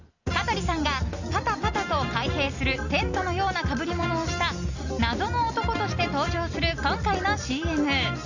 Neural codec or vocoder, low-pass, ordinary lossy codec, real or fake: none; 7.2 kHz; none; real